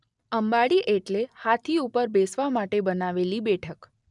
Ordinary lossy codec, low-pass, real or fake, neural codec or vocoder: none; 10.8 kHz; real; none